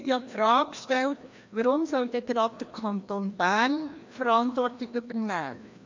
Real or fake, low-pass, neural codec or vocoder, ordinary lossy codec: fake; 7.2 kHz; codec, 16 kHz, 1 kbps, FreqCodec, larger model; MP3, 48 kbps